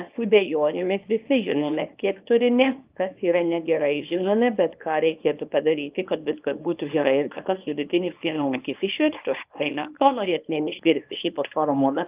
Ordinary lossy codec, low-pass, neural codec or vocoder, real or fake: Opus, 24 kbps; 3.6 kHz; codec, 24 kHz, 0.9 kbps, WavTokenizer, small release; fake